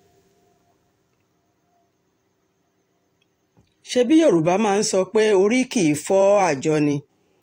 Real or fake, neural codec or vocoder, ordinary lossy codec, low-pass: fake; vocoder, 44.1 kHz, 128 mel bands every 512 samples, BigVGAN v2; AAC, 48 kbps; 19.8 kHz